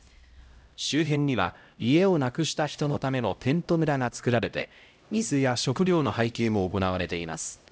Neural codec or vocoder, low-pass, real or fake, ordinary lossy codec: codec, 16 kHz, 0.5 kbps, X-Codec, HuBERT features, trained on LibriSpeech; none; fake; none